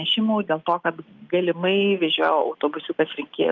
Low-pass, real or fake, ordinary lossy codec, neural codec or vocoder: 7.2 kHz; real; Opus, 24 kbps; none